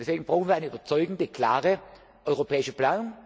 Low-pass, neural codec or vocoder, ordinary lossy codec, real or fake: none; none; none; real